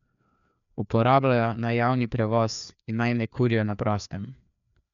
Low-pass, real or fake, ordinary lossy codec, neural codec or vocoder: 7.2 kHz; fake; none; codec, 16 kHz, 2 kbps, FreqCodec, larger model